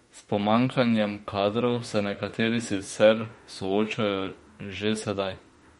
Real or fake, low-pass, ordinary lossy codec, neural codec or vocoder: fake; 19.8 kHz; MP3, 48 kbps; autoencoder, 48 kHz, 32 numbers a frame, DAC-VAE, trained on Japanese speech